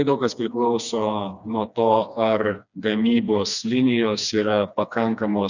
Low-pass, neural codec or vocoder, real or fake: 7.2 kHz; codec, 16 kHz, 2 kbps, FreqCodec, smaller model; fake